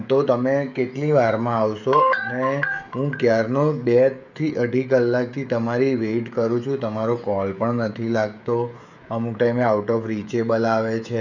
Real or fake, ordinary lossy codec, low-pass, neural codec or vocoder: fake; none; 7.2 kHz; codec, 16 kHz, 16 kbps, FreqCodec, smaller model